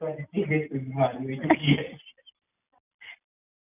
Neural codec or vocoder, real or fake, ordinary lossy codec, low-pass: none; real; none; 3.6 kHz